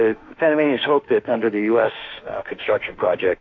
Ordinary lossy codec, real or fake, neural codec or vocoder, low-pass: AAC, 48 kbps; fake; autoencoder, 48 kHz, 32 numbers a frame, DAC-VAE, trained on Japanese speech; 7.2 kHz